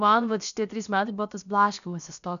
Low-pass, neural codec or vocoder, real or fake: 7.2 kHz; codec, 16 kHz, about 1 kbps, DyCAST, with the encoder's durations; fake